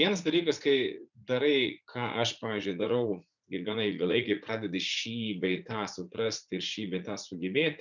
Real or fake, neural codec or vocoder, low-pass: fake; vocoder, 44.1 kHz, 80 mel bands, Vocos; 7.2 kHz